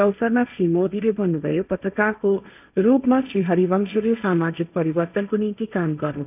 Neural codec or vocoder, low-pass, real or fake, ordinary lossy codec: codec, 16 kHz, 1.1 kbps, Voila-Tokenizer; 3.6 kHz; fake; none